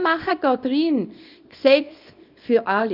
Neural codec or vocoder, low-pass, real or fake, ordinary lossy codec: codec, 24 kHz, 0.9 kbps, WavTokenizer, small release; 5.4 kHz; fake; none